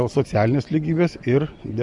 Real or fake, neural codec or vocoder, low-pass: real; none; 10.8 kHz